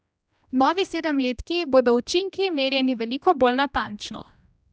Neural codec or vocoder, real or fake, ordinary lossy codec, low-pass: codec, 16 kHz, 1 kbps, X-Codec, HuBERT features, trained on general audio; fake; none; none